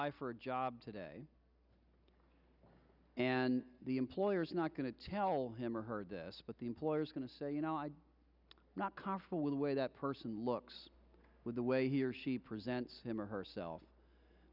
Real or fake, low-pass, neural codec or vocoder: real; 5.4 kHz; none